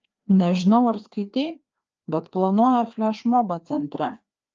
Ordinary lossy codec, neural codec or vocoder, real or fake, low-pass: Opus, 24 kbps; codec, 16 kHz, 2 kbps, FreqCodec, larger model; fake; 7.2 kHz